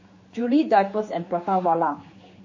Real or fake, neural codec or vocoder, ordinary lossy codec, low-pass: fake; codec, 16 kHz, 4 kbps, X-Codec, HuBERT features, trained on general audio; MP3, 32 kbps; 7.2 kHz